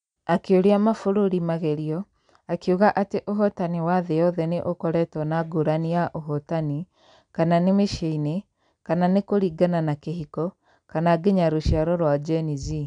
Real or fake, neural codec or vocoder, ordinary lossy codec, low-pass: real; none; none; 9.9 kHz